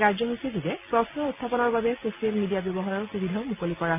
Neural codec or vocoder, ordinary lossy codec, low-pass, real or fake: none; none; 3.6 kHz; real